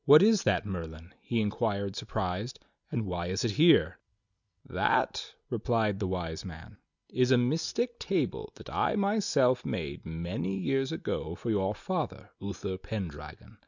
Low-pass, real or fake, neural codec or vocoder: 7.2 kHz; real; none